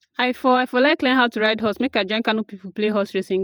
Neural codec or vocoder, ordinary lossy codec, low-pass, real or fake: vocoder, 48 kHz, 128 mel bands, Vocos; none; none; fake